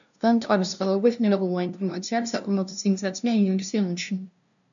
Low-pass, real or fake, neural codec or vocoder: 7.2 kHz; fake; codec, 16 kHz, 0.5 kbps, FunCodec, trained on LibriTTS, 25 frames a second